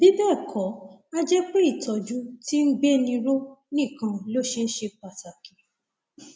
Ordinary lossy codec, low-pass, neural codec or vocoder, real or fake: none; none; none; real